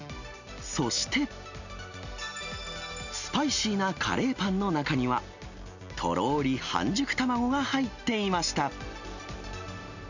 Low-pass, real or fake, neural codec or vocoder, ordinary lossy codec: 7.2 kHz; real; none; none